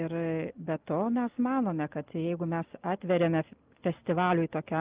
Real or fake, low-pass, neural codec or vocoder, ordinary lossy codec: real; 3.6 kHz; none; Opus, 32 kbps